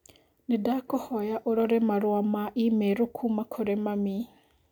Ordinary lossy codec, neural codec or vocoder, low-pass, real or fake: none; none; 19.8 kHz; real